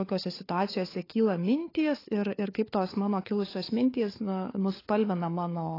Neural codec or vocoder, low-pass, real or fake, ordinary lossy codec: codec, 16 kHz, 4 kbps, FunCodec, trained on LibriTTS, 50 frames a second; 5.4 kHz; fake; AAC, 24 kbps